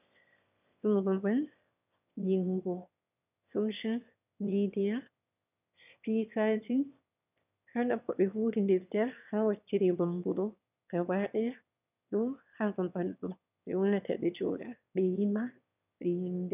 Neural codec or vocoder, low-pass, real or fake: autoencoder, 22.05 kHz, a latent of 192 numbers a frame, VITS, trained on one speaker; 3.6 kHz; fake